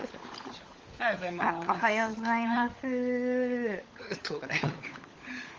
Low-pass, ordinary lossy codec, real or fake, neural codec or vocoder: 7.2 kHz; Opus, 32 kbps; fake; codec, 16 kHz, 16 kbps, FunCodec, trained on LibriTTS, 50 frames a second